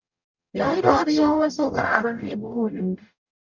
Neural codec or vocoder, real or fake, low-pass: codec, 44.1 kHz, 0.9 kbps, DAC; fake; 7.2 kHz